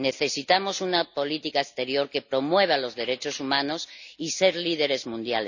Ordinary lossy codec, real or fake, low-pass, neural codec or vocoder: none; real; 7.2 kHz; none